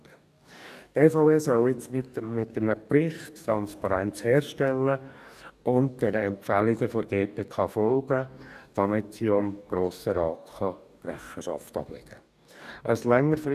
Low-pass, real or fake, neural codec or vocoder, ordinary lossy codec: 14.4 kHz; fake; codec, 44.1 kHz, 2.6 kbps, DAC; none